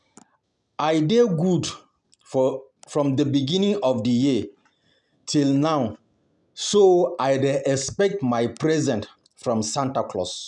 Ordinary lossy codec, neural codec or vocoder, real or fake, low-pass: none; none; real; 10.8 kHz